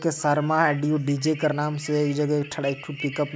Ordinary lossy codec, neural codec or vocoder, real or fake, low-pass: none; none; real; none